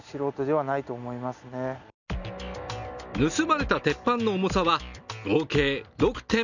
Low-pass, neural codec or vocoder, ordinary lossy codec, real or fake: 7.2 kHz; none; none; real